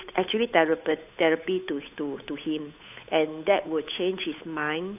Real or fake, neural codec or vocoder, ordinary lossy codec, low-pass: fake; codec, 16 kHz, 8 kbps, FunCodec, trained on Chinese and English, 25 frames a second; AAC, 32 kbps; 3.6 kHz